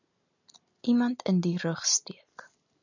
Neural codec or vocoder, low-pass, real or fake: none; 7.2 kHz; real